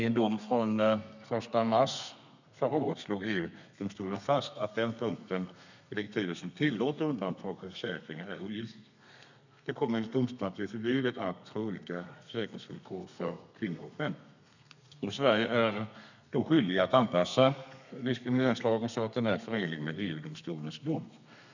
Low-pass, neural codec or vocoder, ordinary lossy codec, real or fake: 7.2 kHz; codec, 32 kHz, 1.9 kbps, SNAC; none; fake